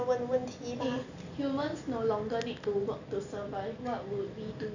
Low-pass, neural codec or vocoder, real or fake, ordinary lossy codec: 7.2 kHz; none; real; none